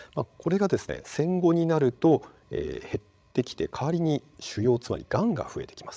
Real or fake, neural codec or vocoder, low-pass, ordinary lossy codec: fake; codec, 16 kHz, 16 kbps, FreqCodec, larger model; none; none